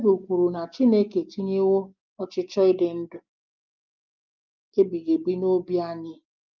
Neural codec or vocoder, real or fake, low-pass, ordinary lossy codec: none; real; 7.2 kHz; Opus, 24 kbps